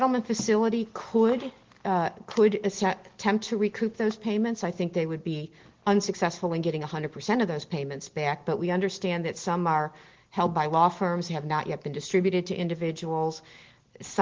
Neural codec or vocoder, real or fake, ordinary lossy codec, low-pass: none; real; Opus, 16 kbps; 7.2 kHz